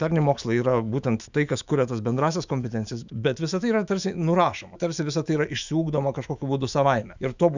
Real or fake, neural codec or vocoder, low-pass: fake; autoencoder, 48 kHz, 128 numbers a frame, DAC-VAE, trained on Japanese speech; 7.2 kHz